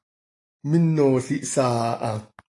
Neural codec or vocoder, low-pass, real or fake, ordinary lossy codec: none; 10.8 kHz; real; AAC, 48 kbps